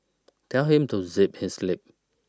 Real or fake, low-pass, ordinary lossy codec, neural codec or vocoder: real; none; none; none